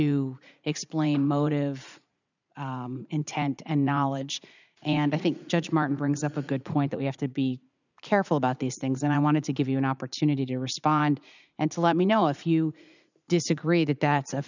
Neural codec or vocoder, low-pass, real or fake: vocoder, 44.1 kHz, 80 mel bands, Vocos; 7.2 kHz; fake